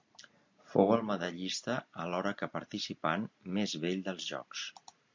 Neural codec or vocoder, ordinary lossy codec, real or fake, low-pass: none; MP3, 48 kbps; real; 7.2 kHz